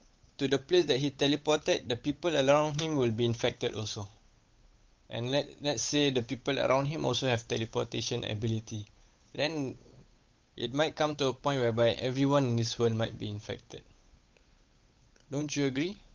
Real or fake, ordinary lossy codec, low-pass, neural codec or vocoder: fake; Opus, 24 kbps; 7.2 kHz; codec, 16 kHz, 8 kbps, FunCodec, trained on Chinese and English, 25 frames a second